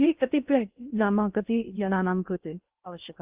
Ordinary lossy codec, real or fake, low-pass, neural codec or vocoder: Opus, 32 kbps; fake; 3.6 kHz; codec, 16 kHz in and 24 kHz out, 0.6 kbps, FocalCodec, streaming, 2048 codes